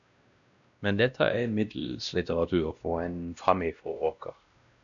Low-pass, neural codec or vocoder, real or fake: 7.2 kHz; codec, 16 kHz, 1 kbps, X-Codec, WavLM features, trained on Multilingual LibriSpeech; fake